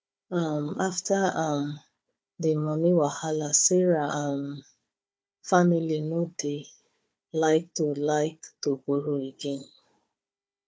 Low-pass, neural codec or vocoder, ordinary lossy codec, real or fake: none; codec, 16 kHz, 4 kbps, FunCodec, trained on Chinese and English, 50 frames a second; none; fake